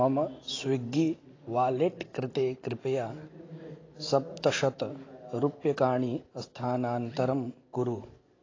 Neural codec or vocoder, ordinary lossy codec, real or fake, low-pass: none; AAC, 32 kbps; real; 7.2 kHz